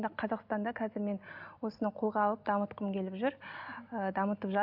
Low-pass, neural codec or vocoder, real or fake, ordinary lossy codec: 5.4 kHz; none; real; none